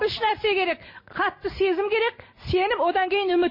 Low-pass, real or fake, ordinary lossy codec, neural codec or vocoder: 5.4 kHz; real; MP3, 24 kbps; none